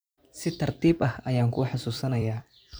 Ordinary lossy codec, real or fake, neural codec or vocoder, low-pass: none; fake; vocoder, 44.1 kHz, 128 mel bands every 256 samples, BigVGAN v2; none